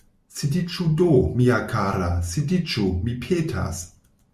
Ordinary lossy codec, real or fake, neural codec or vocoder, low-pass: Opus, 64 kbps; real; none; 14.4 kHz